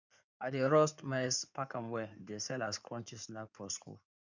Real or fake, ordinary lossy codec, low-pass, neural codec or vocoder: fake; none; 7.2 kHz; codec, 16 kHz in and 24 kHz out, 2.2 kbps, FireRedTTS-2 codec